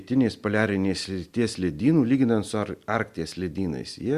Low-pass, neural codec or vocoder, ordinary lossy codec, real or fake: 14.4 kHz; none; Opus, 64 kbps; real